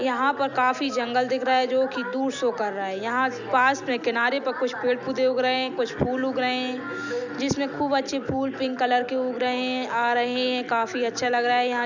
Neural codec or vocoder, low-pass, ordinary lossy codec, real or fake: none; 7.2 kHz; none; real